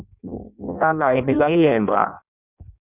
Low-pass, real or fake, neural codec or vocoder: 3.6 kHz; fake; codec, 16 kHz in and 24 kHz out, 0.6 kbps, FireRedTTS-2 codec